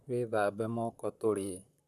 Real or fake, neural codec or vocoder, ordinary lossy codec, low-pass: fake; vocoder, 44.1 kHz, 128 mel bands, Pupu-Vocoder; none; 14.4 kHz